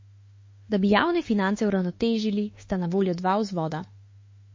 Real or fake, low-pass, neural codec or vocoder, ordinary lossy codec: fake; 7.2 kHz; autoencoder, 48 kHz, 32 numbers a frame, DAC-VAE, trained on Japanese speech; MP3, 32 kbps